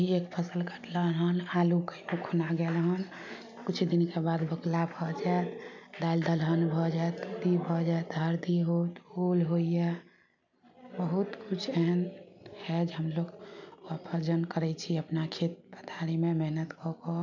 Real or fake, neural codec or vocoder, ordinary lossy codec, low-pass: real; none; none; 7.2 kHz